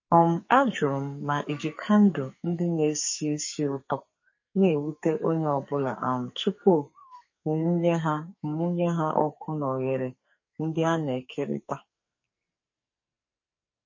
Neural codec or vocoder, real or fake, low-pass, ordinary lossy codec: codec, 44.1 kHz, 2.6 kbps, SNAC; fake; 7.2 kHz; MP3, 32 kbps